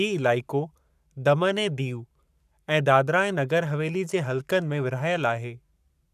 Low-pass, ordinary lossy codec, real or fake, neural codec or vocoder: 14.4 kHz; none; fake; codec, 44.1 kHz, 7.8 kbps, Pupu-Codec